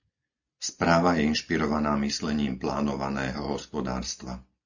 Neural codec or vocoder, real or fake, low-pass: none; real; 7.2 kHz